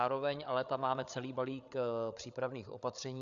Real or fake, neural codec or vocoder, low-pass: fake; codec, 16 kHz, 8 kbps, FreqCodec, larger model; 7.2 kHz